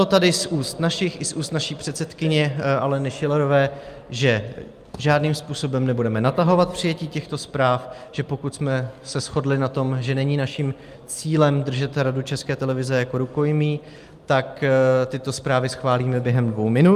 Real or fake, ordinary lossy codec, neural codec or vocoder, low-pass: real; Opus, 32 kbps; none; 14.4 kHz